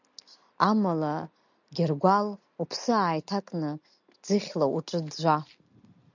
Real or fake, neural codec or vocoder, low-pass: real; none; 7.2 kHz